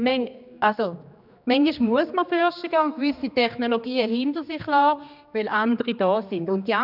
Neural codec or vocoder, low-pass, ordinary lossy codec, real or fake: codec, 16 kHz, 2 kbps, X-Codec, HuBERT features, trained on general audio; 5.4 kHz; none; fake